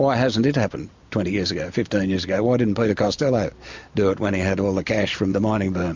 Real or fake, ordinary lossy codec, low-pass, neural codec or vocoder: real; MP3, 64 kbps; 7.2 kHz; none